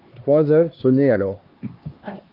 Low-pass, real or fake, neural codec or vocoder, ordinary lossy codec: 5.4 kHz; fake; codec, 16 kHz, 2 kbps, X-Codec, HuBERT features, trained on LibriSpeech; Opus, 32 kbps